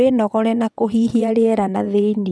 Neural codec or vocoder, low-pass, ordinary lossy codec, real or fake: vocoder, 22.05 kHz, 80 mel bands, WaveNeXt; none; none; fake